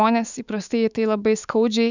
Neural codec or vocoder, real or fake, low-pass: autoencoder, 48 kHz, 128 numbers a frame, DAC-VAE, trained on Japanese speech; fake; 7.2 kHz